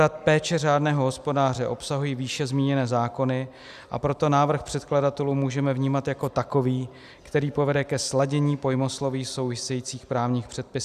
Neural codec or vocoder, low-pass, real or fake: none; 14.4 kHz; real